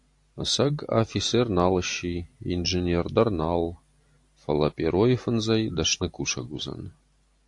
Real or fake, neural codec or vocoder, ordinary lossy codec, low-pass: real; none; AAC, 64 kbps; 10.8 kHz